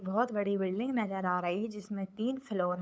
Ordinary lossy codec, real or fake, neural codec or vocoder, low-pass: none; fake; codec, 16 kHz, 8 kbps, FunCodec, trained on LibriTTS, 25 frames a second; none